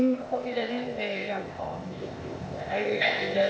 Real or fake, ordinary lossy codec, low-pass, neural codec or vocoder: fake; none; none; codec, 16 kHz, 0.8 kbps, ZipCodec